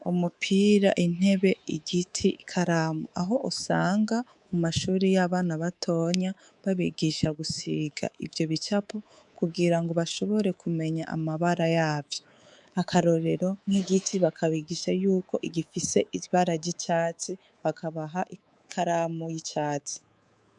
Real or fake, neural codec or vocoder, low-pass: fake; codec, 24 kHz, 3.1 kbps, DualCodec; 10.8 kHz